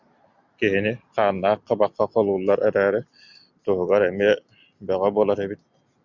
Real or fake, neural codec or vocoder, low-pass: real; none; 7.2 kHz